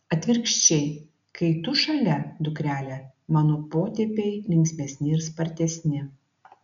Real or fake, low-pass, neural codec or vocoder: real; 7.2 kHz; none